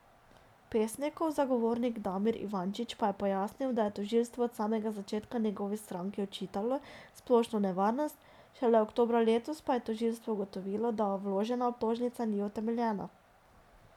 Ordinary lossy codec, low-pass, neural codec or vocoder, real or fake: none; 19.8 kHz; none; real